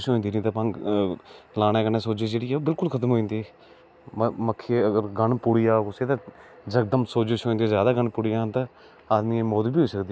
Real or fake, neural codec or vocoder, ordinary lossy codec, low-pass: real; none; none; none